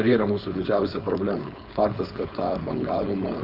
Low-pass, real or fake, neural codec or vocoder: 5.4 kHz; fake; codec, 16 kHz, 4.8 kbps, FACodec